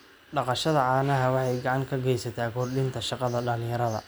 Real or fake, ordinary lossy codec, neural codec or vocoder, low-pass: real; none; none; none